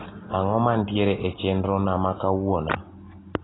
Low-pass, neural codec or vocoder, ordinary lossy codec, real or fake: 7.2 kHz; none; AAC, 16 kbps; real